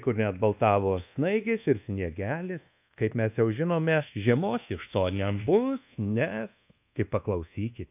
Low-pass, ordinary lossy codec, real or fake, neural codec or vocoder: 3.6 kHz; AAC, 32 kbps; fake; codec, 24 kHz, 1.2 kbps, DualCodec